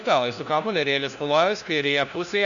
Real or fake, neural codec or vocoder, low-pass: fake; codec, 16 kHz, 1 kbps, FunCodec, trained on LibriTTS, 50 frames a second; 7.2 kHz